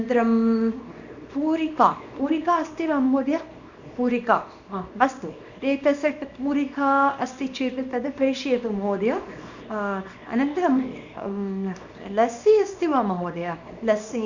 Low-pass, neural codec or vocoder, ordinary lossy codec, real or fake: 7.2 kHz; codec, 24 kHz, 0.9 kbps, WavTokenizer, small release; none; fake